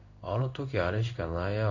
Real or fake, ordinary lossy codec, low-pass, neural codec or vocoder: fake; none; 7.2 kHz; codec, 16 kHz in and 24 kHz out, 1 kbps, XY-Tokenizer